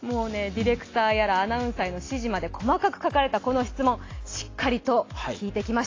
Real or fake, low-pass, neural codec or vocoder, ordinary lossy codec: real; 7.2 kHz; none; AAC, 32 kbps